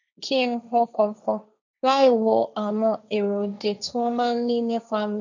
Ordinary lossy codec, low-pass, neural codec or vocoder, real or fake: none; 7.2 kHz; codec, 16 kHz, 1.1 kbps, Voila-Tokenizer; fake